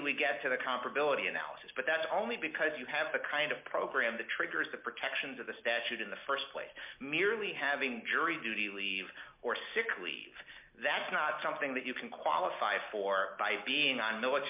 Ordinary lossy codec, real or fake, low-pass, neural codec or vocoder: MP3, 32 kbps; real; 3.6 kHz; none